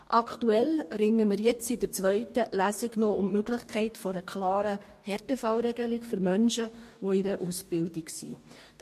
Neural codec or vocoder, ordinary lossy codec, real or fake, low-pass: codec, 44.1 kHz, 2.6 kbps, DAC; MP3, 64 kbps; fake; 14.4 kHz